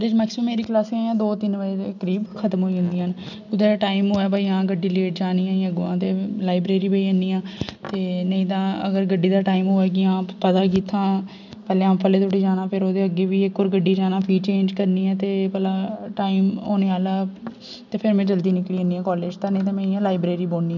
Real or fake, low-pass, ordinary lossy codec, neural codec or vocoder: real; 7.2 kHz; none; none